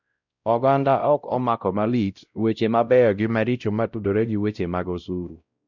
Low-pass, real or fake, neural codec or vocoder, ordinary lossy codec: 7.2 kHz; fake; codec, 16 kHz, 0.5 kbps, X-Codec, WavLM features, trained on Multilingual LibriSpeech; none